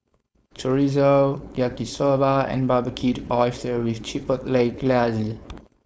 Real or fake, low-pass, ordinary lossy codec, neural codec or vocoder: fake; none; none; codec, 16 kHz, 4.8 kbps, FACodec